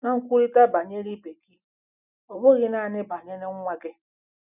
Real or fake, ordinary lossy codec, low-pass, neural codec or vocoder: real; none; 3.6 kHz; none